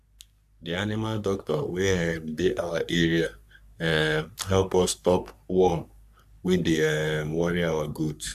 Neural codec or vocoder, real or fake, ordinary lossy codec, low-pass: codec, 44.1 kHz, 3.4 kbps, Pupu-Codec; fake; none; 14.4 kHz